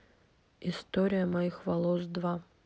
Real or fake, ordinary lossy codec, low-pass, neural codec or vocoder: real; none; none; none